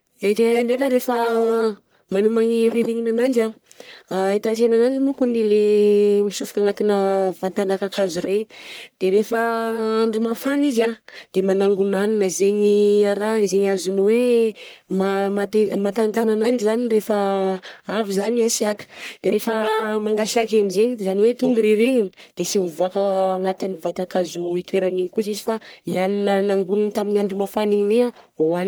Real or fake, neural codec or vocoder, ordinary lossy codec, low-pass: fake; codec, 44.1 kHz, 1.7 kbps, Pupu-Codec; none; none